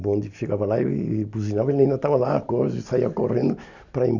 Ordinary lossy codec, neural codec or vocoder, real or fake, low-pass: none; none; real; 7.2 kHz